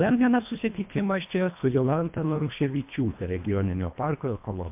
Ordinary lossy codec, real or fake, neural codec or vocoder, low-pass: MP3, 32 kbps; fake; codec, 24 kHz, 1.5 kbps, HILCodec; 3.6 kHz